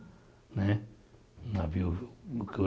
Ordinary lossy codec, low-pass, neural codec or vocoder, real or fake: none; none; none; real